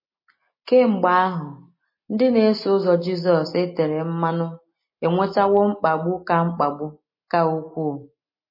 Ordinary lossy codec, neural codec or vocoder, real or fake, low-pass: MP3, 24 kbps; none; real; 5.4 kHz